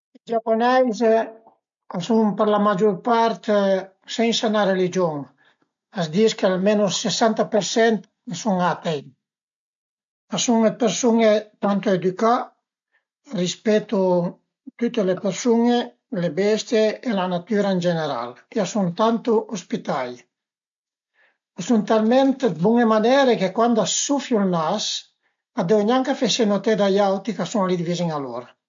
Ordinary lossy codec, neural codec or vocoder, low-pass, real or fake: MP3, 48 kbps; none; 7.2 kHz; real